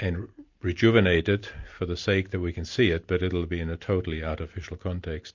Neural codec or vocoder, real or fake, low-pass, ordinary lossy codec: none; real; 7.2 kHz; MP3, 48 kbps